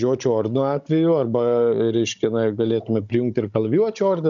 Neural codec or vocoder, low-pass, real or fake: codec, 16 kHz, 16 kbps, FunCodec, trained on Chinese and English, 50 frames a second; 7.2 kHz; fake